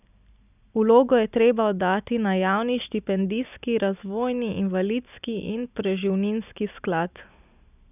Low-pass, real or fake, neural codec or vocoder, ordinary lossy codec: 3.6 kHz; real; none; none